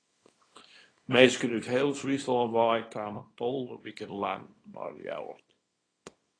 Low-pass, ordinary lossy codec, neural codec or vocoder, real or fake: 9.9 kHz; AAC, 32 kbps; codec, 24 kHz, 0.9 kbps, WavTokenizer, small release; fake